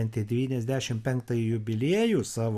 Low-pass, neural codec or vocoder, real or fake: 14.4 kHz; none; real